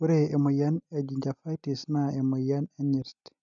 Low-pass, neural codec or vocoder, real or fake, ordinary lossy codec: 7.2 kHz; none; real; none